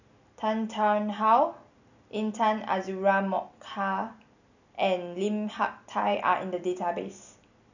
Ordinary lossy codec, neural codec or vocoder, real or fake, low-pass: none; none; real; 7.2 kHz